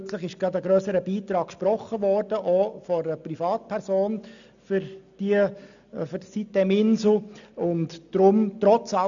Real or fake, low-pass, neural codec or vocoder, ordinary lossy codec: real; 7.2 kHz; none; none